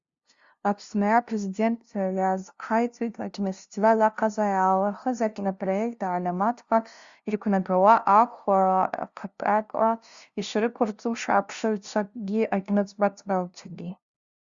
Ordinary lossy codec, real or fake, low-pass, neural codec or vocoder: Opus, 64 kbps; fake; 7.2 kHz; codec, 16 kHz, 0.5 kbps, FunCodec, trained on LibriTTS, 25 frames a second